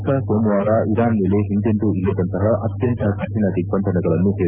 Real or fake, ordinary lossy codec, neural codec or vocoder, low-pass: fake; none; autoencoder, 48 kHz, 128 numbers a frame, DAC-VAE, trained on Japanese speech; 3.6 kHz